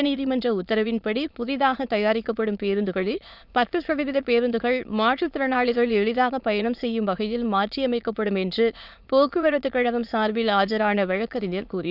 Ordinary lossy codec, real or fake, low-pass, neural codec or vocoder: none; fake; 5.4 kHz; autoencoder, 22.05 kHz, a latent of 192 numbers a frame, VITS, trained on many speakers